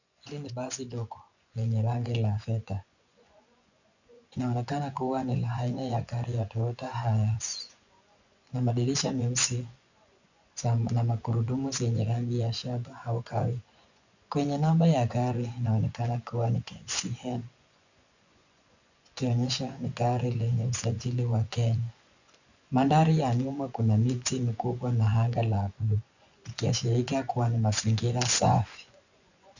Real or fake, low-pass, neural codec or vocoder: fake; 7.2 kHz; vocoder, 24 kHz, 100 mel bands, Vocos